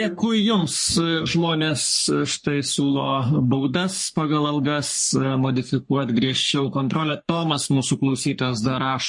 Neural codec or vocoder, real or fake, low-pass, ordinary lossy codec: codec, 44.1 kHz, 3.4 kbps, Pupu-Codec; fake; 10.8 kHz; MP3, 48 kbps